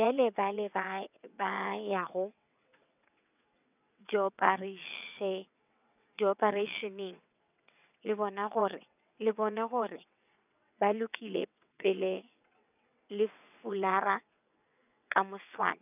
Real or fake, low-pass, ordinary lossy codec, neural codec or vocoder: fake; 3.6 kHz; none; vocoder, 22.05 kHz, 80 mel bands, WaveNeXt